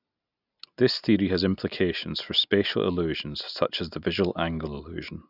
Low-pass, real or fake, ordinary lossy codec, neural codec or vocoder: 5.4 kHz; real; none; none